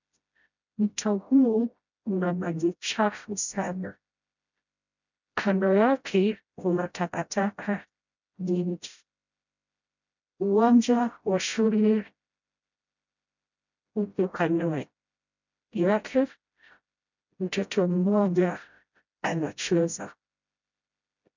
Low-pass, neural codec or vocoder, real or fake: 7.2 kHz; codec, 16 kHz, 0.5 kbps, FreqCodec, smaller model; fake